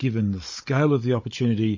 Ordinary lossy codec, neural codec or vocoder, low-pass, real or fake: MP3, 32 kbps; codec, 16 kHz, 16 kbps, FunCodec, trained on Chinese and English, 50 frames a second; 7.2 kHz; fake